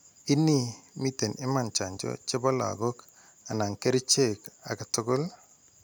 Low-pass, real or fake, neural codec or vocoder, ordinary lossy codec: none; real; none; none